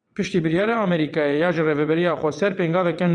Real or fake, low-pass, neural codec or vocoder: fake; 9.9 kHz; vocoder, 22.05 kHz, 80 mel bands, WaveNeXt